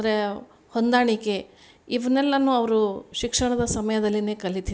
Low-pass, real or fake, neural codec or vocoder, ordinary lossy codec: none; real; none; none